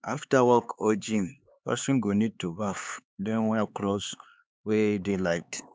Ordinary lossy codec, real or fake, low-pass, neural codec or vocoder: none; fake; none; codec, 16 kHz, 4 kbps, X-Codec, HuBERT features, trained on LibriSpeech